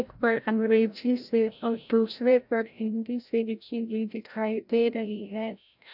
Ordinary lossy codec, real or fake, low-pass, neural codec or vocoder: none; fake; 5.4 kHz; codec, 16 kHz, 0.5 kbps, FreqCodec, larger model